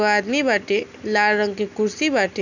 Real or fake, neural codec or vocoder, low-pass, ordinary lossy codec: real; none; 7.2 kHz; none